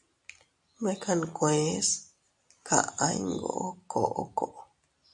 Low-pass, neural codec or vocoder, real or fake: 10.8 kHz; none; real